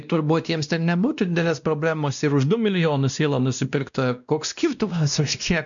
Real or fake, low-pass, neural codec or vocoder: fake; 7.2 kHz; codec, 16 kHz, 1 kbps, X-Codec, WavLM features, trained on Multilingual LibriSpeech